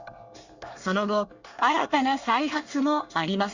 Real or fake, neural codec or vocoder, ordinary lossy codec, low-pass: fake; codec, 24 kHz, 1 kbps, SNAC; Opus, 64 kbps; 7.2 kHz